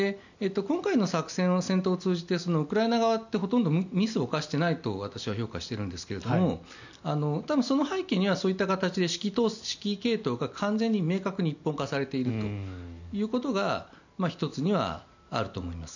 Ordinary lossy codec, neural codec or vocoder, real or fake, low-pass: none; none; real; 7.2 kHz